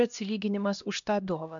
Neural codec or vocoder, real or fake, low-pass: codec, 16 kHz, 1 kbps, X-Codec, HuBERT features, trained on LibriSpeech; fake; 7.2 kHz